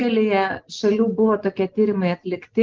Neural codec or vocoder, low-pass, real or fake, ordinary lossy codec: none; 7.2 kHz; real; Opus, 16 kbps